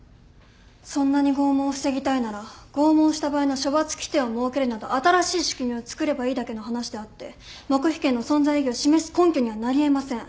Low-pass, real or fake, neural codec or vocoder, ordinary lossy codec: none; real; none; none